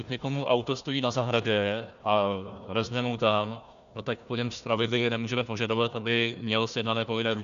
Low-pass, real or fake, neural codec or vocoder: 7.2 kHz; fake; codec, 16 kHz, 1 kbps, FunCodec, trained on Chinese and English, 50 frames a second